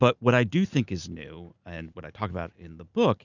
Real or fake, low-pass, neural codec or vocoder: real; 7.2 kHz; none